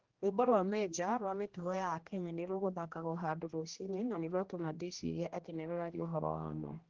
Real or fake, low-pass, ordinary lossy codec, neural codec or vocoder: fake; 7.2 kHz; Opus, 16 kbps; codec, 16 kHz, 1 kbps, X-Codec, HuBERT features, trained on general audio